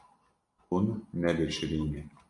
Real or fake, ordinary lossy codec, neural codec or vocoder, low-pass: real; MP3, 48 kbps; none; 10.8 kHz